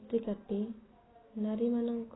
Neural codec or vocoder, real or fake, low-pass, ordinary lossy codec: none; real; 7.2 kHz; AAC, 16 kbps